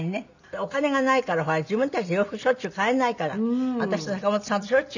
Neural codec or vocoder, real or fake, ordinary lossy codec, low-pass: none; real; none; 7.2 kHz